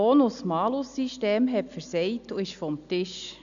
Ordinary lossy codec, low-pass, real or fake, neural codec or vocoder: MP3, 96 kbps; 7.2 kHz; real; none